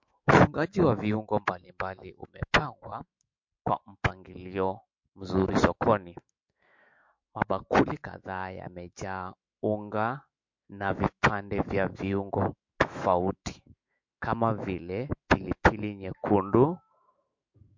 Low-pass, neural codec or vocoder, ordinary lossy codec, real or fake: 7.2 kHz; autoencoder, 48 kHz, 128 numbers a frame, DAC-VAE, trained on Japanese speech; MP3, 48 kbps; fake